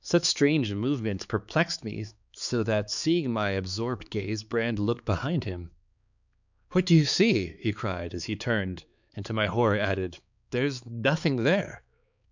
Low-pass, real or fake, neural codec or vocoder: 7.2 kHz; fake; codec, 16 kHz, 4 kbps, X-Codec, HuBERT features, trained on balanced general audio